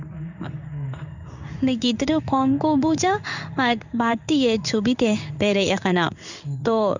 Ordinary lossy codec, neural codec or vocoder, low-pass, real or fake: none; codec, 16 kHz in and 24 kHz out, 1 kbps, XY-Tokenizer; 7.2 kHz; fake